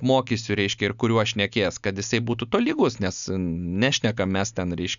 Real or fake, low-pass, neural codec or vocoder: real; 7.2 kHz; none